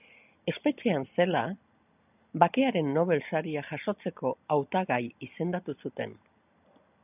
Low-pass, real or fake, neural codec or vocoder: 3.6 kHz; real; none